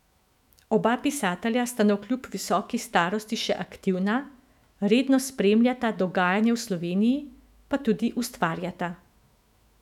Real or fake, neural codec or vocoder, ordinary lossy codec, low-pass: fake; autoencoder, 48 kHz, 128 numbers a frame, DAC-VAE, trained on Japanese speech; none; 19.8 kHz